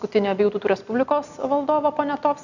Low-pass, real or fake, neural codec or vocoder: 7.2 kHz; real; none